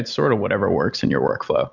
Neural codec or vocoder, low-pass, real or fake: none; 7.2 kHz; real